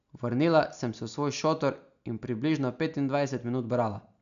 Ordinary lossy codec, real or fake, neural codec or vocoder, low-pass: none; real; none; 7.2 kHz